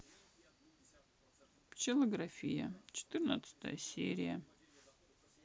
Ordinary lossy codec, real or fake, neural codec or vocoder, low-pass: none; real; none; none